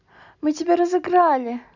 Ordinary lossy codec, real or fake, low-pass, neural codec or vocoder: none; real; 7.2 kHz; none